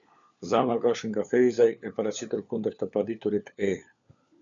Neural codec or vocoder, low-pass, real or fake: codec, 16 kHz, 4 kbps, FunCodec, trained on LibriTTS, 50 frames a second; 7.2 kHz; fake